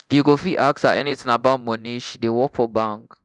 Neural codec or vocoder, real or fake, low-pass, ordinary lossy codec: codec, 24 kHz, 0.9 kbps, DualCodec; fake; 10.8 kHz; none